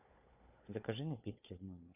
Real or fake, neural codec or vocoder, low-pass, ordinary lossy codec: fake; codec, 16 kHz, 4 kbps, FunCodec, trained on Chinese and English, 50 frames a second; 3.6 kHz; AAC, 32 kbps